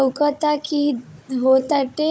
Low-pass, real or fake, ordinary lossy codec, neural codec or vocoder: none; fake; none; codec, 16 kHz, 16 kbps, FunCodec, trained on Chinese and English, 50 frames a second